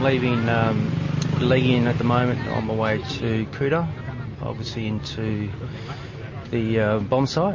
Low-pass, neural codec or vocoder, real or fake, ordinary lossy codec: 7.2 kHz; none; real; MP3, 32 kbps